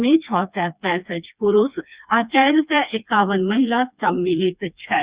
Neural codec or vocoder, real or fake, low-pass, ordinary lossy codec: codec, 16 kHz, 2 kbps, FreqCodec, smaller model; fake; 3.6 kHz; Opus, 64 kbps